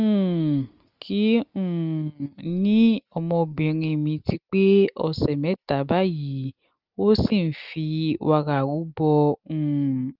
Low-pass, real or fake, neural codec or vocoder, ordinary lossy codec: 5.4 kHz; real; none; Opus, 24 kbps